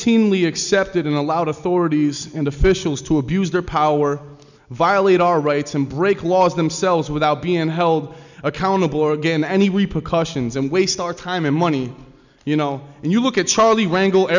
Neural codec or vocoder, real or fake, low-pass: none; real; 7.2 kHz